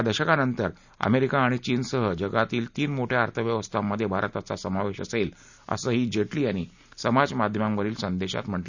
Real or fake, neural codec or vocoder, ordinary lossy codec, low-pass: real; none; none; 7.2 kHz